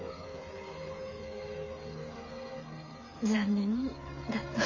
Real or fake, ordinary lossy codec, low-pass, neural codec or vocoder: fake; MP3, 32 kbps; 7.2 kHz; codec, 16 kHz, 8 kbps, FreqCodec, smaller model